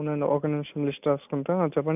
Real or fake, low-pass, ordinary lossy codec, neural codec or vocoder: real; 3.6 kHz; none; none